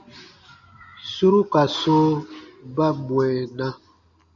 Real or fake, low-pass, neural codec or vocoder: real; 7.2 kHz; none